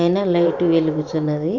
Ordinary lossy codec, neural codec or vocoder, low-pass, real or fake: none; vocoder, 44.1 kHz, 128 mel bands every 512 samples, BigVGAN v2; 7.2 kHz; fake